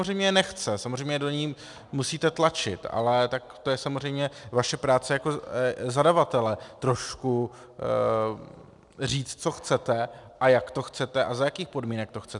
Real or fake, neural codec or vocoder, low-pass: real; none; 10.8 kHz